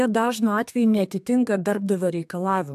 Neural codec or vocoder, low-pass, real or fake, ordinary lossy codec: codec, 32 kHz, 1.9 kbps, SNAC; 14.4 kHz; fake; AAC, 96 kbps